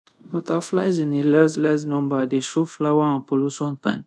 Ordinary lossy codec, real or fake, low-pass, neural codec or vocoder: none; fake; none; codec, 24 kHz, 0.5 kbps, DualCodec